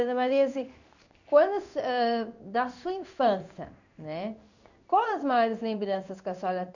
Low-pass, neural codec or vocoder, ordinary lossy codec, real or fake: 7.2 kHz; codec, 16 kHz in and 24 kHz out, 1 kbps, XY-Tokenizer; none; fake